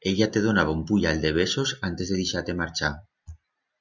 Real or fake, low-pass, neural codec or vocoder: real; 7.2 kHz; none